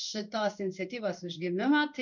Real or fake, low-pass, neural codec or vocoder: fake; 7.2 kHz; codec, 16 kHz in and 24 kHz out, 1 kbps, XY-Tokenizer